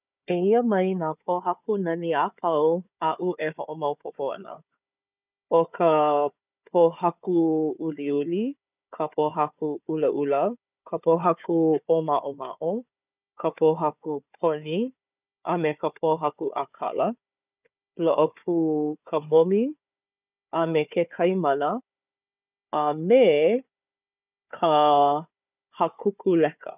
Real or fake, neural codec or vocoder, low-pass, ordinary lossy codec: fake; codec, 16 kHz, 4 kbps, FunCodec, trained on Chinese and English, 50 frames a second; 3.6 kHz; none